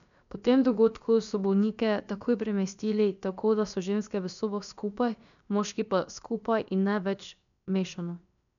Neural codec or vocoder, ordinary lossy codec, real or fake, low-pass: codec, 16 kHz, about 1 kbps, DyCAST, with the encoder's durations; none; fake; 7.2 kHz